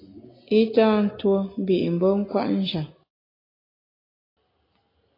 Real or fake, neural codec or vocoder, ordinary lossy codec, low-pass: real; none; AAC, 24 kbps; 5.4 kHz